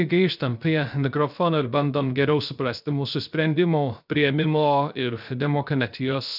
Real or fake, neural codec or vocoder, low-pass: fake; codec, 16 kHz, 0.3 kbps, FocalCodec; 5.4 kHz